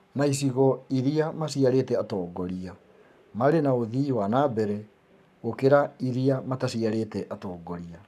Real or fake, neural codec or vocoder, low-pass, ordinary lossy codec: fake; codec, 44.1 kHz, 7.8 kbps, Pupu-Codec; 14.4 kHz; none